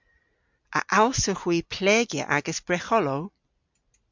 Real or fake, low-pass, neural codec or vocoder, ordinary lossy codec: real; 7.2 kHz; none; MP3, 64 kbps